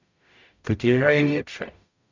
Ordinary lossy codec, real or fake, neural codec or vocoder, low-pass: MP3, 64 kbps; fake; codec, 44.1 kHz, 0.9 kbps, DAC; 7.2 kHz